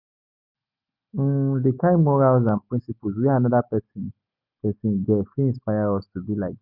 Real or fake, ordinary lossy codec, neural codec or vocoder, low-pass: real; none; none; 5.4 kHz